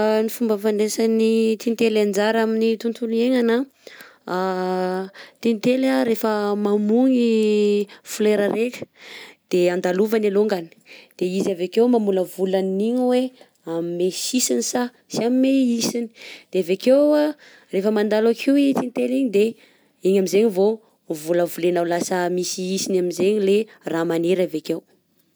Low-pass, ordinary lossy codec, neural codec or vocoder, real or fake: none; none; none; real